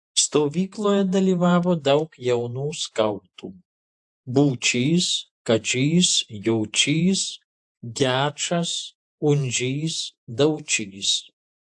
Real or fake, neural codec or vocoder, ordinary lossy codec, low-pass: fake; vocoder, 48 kHz, 128 mel bands, Vocos; AAC, 64 kbps; 10.8 kHz